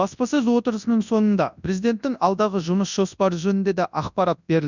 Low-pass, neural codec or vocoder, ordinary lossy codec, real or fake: 7.2 kHz; codec, 24 kHz, 0.9 kbps, WavTokenizer, large speech release; none; fake